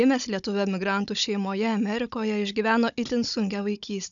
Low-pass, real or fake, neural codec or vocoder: 7.2 kHz; fake; codec, 16 kHz, 16 kbps, FunCodec, trained on Chinese and English, 50 frames a second